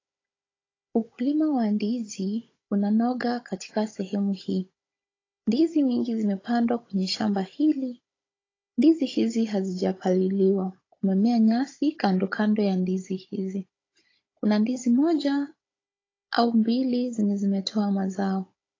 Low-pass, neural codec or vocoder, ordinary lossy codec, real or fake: 7.2 kHz; codec, 16 kHz, 16 kbps, FunCodec, trained on Chinese and English, 50 frames a second; AAC, 32 kbps; fake